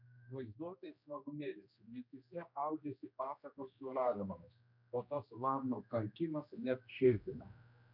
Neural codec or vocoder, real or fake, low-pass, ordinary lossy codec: codec, 16 kHz, 1 kbps, X-Codec, HuBERT features, trained on general audio; fake; 5.4 kHz; MP3, 48 kbps